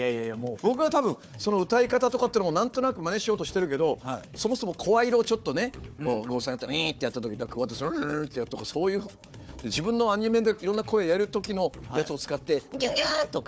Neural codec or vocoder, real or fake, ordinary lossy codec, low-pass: codec, 16 kHz, 4 kbps, FunCodec, trained on Chinese and English, 50 frames a second; fake; none; none